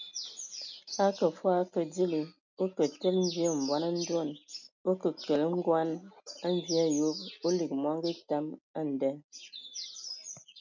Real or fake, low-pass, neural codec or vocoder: real; 7.2 kHz; none